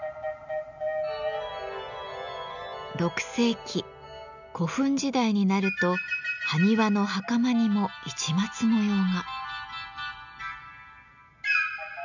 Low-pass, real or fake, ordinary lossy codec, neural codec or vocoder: 7.2 kHz; real; none; none